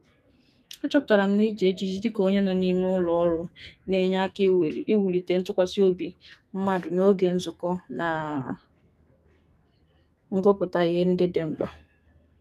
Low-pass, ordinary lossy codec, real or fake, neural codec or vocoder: 14.4 kHz; none; fake; codec, 44.1 kHz, 2.6 kbps, SNAC